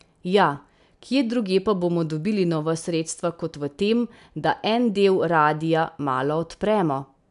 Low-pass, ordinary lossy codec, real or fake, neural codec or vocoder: 10.8 kHz; none; real; none